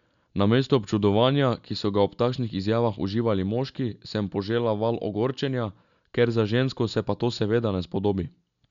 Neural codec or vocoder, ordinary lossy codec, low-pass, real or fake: none; none; 7.2 kHz; real